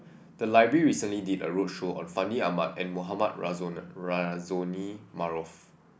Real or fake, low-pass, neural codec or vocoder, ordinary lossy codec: real; none; none; none